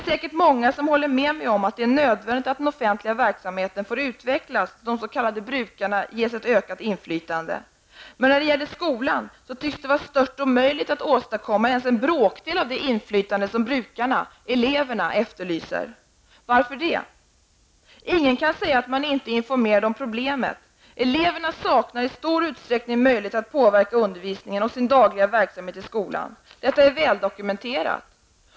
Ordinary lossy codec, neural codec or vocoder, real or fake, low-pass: none; none; real; none